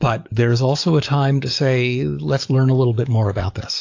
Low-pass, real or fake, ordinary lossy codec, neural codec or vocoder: 7.2 kHz; fake; AAC, 48 kbps; codec, 44.1 kHz, 7.8 kbps, DAC